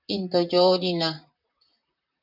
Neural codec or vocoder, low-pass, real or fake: vocoder, 44.1 kHz, 128 mel bands, Pupu-Vocoder; 5.4 kHz; fake